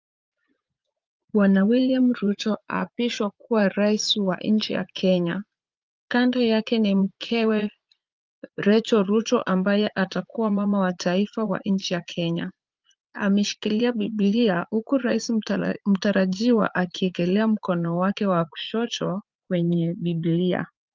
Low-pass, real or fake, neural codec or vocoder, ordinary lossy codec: 7.2 kHz; fake; vocoder, 44.1 kHz, 80 mel bands, Vocos; Opus, 32 kbps